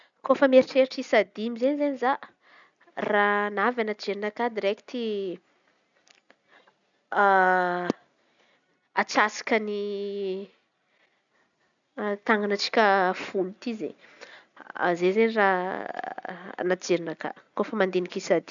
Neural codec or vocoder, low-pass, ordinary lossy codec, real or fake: none; 7.2 kHz; none; real